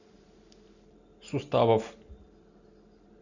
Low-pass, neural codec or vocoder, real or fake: 7.2 kHz; none; real